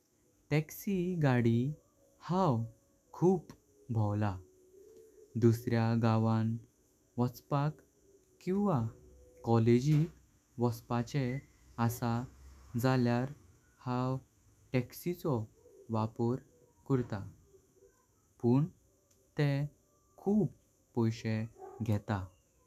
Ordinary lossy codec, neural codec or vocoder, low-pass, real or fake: none; autoencoder, 48 kHz, 128 numbers a frame, DAC-VAE, trained on Japanese speech; 14.4 kHz; fake